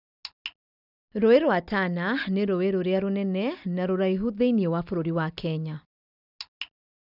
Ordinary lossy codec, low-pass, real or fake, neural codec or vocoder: none; 5.4 kHz; real; none